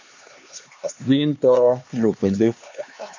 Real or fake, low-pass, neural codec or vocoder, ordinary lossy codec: fake; 7.2 kHz; codec, 16 kHz, 2 kbps, X-Codec, HuBERT features, trained on LibriSpeech; MP3, 64 kbps